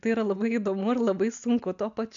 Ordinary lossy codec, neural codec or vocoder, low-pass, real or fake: AAC, 64 kbps; none; 7.2 kHz; real